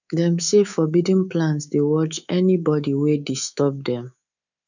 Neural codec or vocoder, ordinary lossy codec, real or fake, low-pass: codec, 24 kHz, 3.1 kbps, DualCodec; none; fake; 7.2 kHz